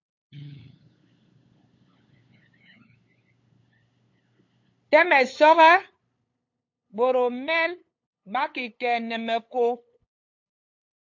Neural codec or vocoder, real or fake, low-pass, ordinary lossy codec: codec, 16 kHz, 8 kbps, FunCodec, trained on LibriTTS, 25 frames a second; fake; 7.2 kHz; AAC, 48 kbps